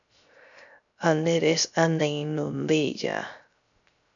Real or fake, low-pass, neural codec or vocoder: fake; 7.2 kHz; codec, 16 kHz, 0.3 kbps, FocalCodec